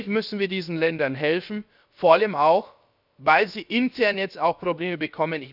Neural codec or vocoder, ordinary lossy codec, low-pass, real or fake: codec, 16 kHz, about 1 kbps, DyCAST, with the encoder's durations; none; 5.4 kHz; fake